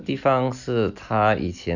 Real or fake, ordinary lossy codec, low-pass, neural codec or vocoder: real; none; 7.2 kHz; none